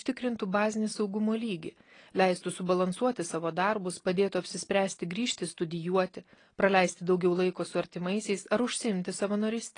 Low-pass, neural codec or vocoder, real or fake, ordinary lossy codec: 9.9 kHz; none; real; AAC, 32 kbps